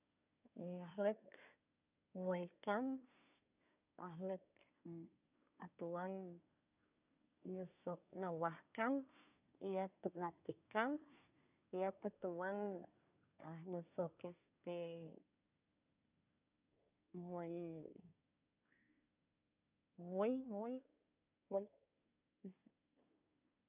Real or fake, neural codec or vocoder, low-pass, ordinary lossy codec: fake; codec, 24 kHz, 1 kbps, SNAC; 3.6 kHz; none